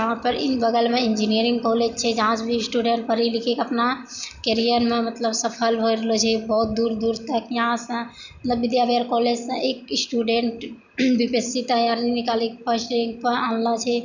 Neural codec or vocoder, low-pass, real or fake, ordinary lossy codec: none; 7.2 kHz; real; none